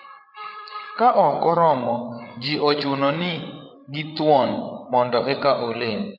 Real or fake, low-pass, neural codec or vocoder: fake; 5.4 kHz; codec, 16 kHz, 8 kbps, FreqCodec, larger model